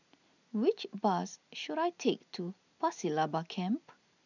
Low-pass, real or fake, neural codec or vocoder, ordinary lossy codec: 7.2 kHz; real; none; none